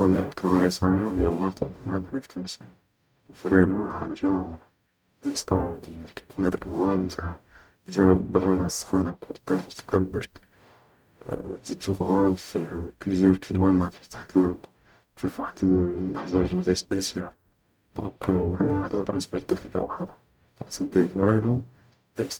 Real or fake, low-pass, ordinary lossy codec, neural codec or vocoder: fake; none; none; codec, 44.1 kHz, 0.9 kbps, DAC